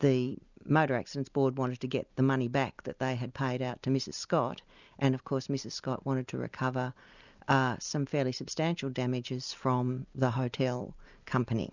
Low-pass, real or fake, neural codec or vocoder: 7.2 kHz; real; none